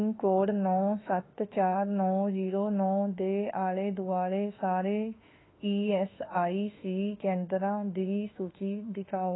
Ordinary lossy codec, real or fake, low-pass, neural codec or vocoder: AAC, 16 kbps; fake; 7.2 kHz; autoencoder, 48 kHz, 32 numbers a frame, DAC-VAE, trained on Japanese speech